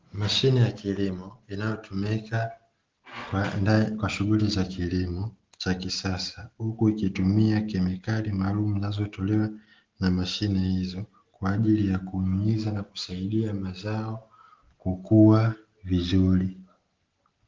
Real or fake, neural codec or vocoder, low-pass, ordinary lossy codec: real; none; 7.2 kHz; Opus, 16 kbps